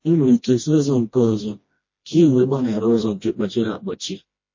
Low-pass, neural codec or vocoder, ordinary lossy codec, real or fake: 7.2 kHz; codec, 16 kHz, 1 kbps, FreqCodec, smaller model; MP3, 32 kbps; fake